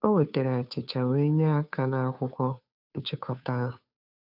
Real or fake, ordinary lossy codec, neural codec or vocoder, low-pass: fake; none; codec, 16 kHz, 2 kbps, FunCodec, trained on Chinese and English, 25 frames a second; 5.4 kHz